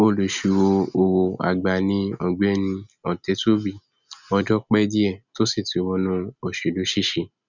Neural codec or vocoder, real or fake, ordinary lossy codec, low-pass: none; real; none; 7.2 kHz